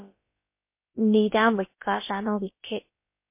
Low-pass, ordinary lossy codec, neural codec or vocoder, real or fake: 3.6 kHz; MP3, 24 kbps; codec, 16 kHz, about 1 kbps, DyCAST, with the encoder's durations; fake